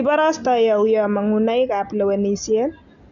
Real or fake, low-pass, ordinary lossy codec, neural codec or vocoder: real; 7.2 kHz; none; none